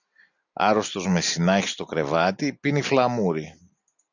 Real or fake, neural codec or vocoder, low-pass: real; none; 7.2 kHz